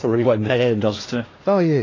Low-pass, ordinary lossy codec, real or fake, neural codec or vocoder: 7.2 kHz; AAC, 32 kbps; fake; codec, 16 kHz, 1 kbps, X-Codec, HuBERT features, trained on LibriSpeech